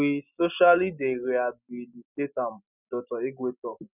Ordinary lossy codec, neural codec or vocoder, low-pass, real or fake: none; none; 3.6 kHz; real